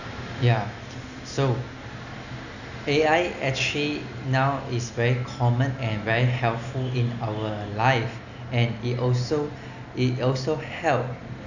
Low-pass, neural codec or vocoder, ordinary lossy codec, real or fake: 7.2 kHz; none; none; real